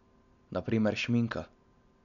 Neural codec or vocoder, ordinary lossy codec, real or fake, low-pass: none; none; real; 7.2 kHz